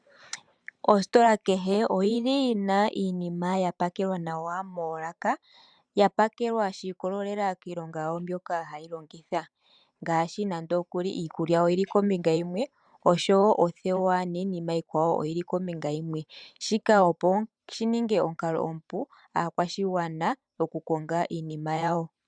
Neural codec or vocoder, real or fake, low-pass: vocoder, 44.1 kHz, 128 mel bands every 512 samples, BigVGAN v2; fake; 9.9 kHz